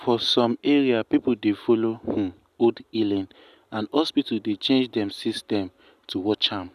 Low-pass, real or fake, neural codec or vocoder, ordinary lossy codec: 14.4 kHz; real; none; none